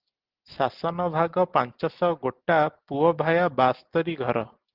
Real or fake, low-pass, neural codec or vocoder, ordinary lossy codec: real; 5.4 kHz; none; Opus, 32 kbps